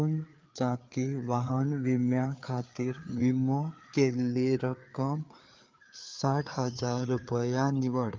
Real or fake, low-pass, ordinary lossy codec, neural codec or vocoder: fake; 7.2 kHz; Opus, 24 kbps; codec, 16 kHz, 4 kbps, FreqCodec, larger model